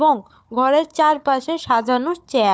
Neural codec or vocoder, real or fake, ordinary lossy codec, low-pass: codec, 16 kHz, 4 kbps, FunCodec, trained on Chinese and English, 50 frames a second; fake; none; none